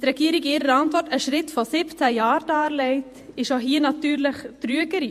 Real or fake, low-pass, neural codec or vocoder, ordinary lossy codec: fake; 14.4 kHz; vocoder, 48 kHz, 128 mel bands, Vocos; MP3, 64 kbps